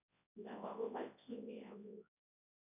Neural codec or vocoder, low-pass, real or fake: codec, 24 kHz, 0.9 kbps, WavTokenizer, large speech release; 3.6 kHz; fake